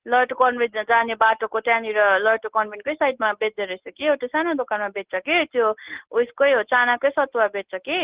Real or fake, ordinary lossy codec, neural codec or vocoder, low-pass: real; Opus, 16 kbps; none; 3.6 kHz